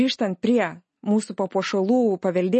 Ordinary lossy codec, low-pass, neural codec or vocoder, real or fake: MP3, 32 kbps; 10.8 kHz; none; real